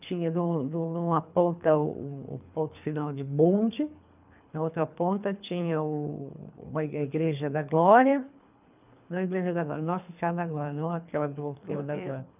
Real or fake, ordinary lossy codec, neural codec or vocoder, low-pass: fake; none; codec, 24 kHz, 3 kbps, HILCodec; 3.6 kHz